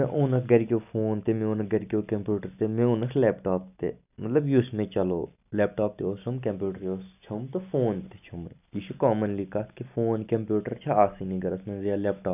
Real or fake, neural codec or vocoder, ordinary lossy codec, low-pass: real; none; none; 3.6 kHz